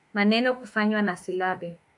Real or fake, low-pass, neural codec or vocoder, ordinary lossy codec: fake; 10.8 kHz; autoencoder, 48 kHz, 32 numbers a frame, DAC-VAE, trained on Japanese speech; AAC, 64 kbps